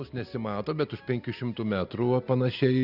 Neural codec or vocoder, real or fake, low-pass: none; real; 5.4 kHz